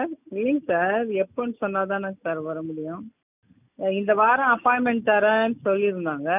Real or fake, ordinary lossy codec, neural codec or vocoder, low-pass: real; none; none; 3.6 kHz